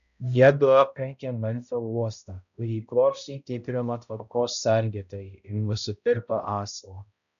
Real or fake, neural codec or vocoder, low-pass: fake; codec, 16 kHz, 0.5 kbps, X-Codec, HuBERT features, trained on balanced general audio; 7.2 kHz